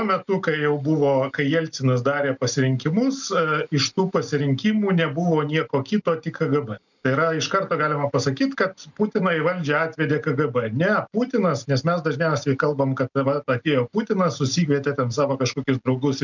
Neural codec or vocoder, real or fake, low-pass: none; real; 7.2 kHz